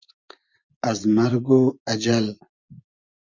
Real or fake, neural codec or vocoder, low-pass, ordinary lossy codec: fake; vocoder, 24 kHz, 100 mel bands, Vocos; 7.2 kHz; Opus, 64 kbps